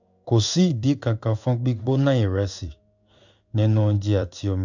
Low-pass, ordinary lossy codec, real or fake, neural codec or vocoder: 7.2 kHz; none; fake; codec, 16 kHz in and 24 kHz out, 1 kbps, XY-Tokenizer